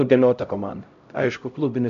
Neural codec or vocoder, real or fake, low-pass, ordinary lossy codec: codec, 16 kHz, 0.5 kbps, X-Codec, HuBERT features, trained on LibriSpeech; fake; 7.2 kHz; MP3, 64 kbps